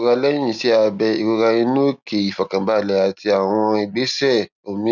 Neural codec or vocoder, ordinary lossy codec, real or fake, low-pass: none; none; real; 7.2 kHz